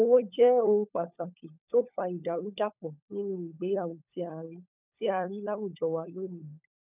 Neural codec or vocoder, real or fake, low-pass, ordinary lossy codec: codec, 16 kHz, 16 kbps, FunCodec, trained on LibriTTS, 50 frames a second; fake; 3.6 kHz; none